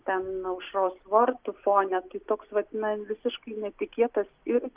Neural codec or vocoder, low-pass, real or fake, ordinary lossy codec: none; 3.6 kHz; real; Opus, 32 kbps